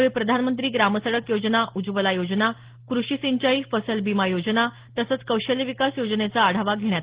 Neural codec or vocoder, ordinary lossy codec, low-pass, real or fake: none; Opus, 16 kbps; 3.6 kHz; real